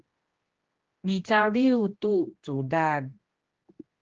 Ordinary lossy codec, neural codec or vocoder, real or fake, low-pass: Opus, 16 kbps; codec, 16 kHz, 1 kbps, X-Codec, HuBERT features, trained on general audio; fake; 7.2 kHz